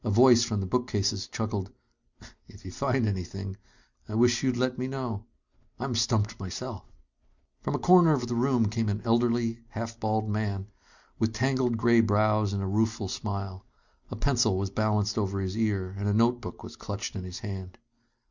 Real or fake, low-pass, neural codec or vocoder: real; 7.2 kHz; none